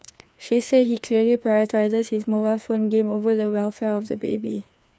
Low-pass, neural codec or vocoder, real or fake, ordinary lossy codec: none; codec, 16 kHz, 2 kbps, FreqCodec, larger model; fake; none